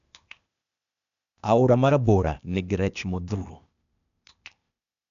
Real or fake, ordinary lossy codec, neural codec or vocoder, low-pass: fake; none; codec, 16 kHz, 0.8 kbps, ZipCodec; 7.2 kHz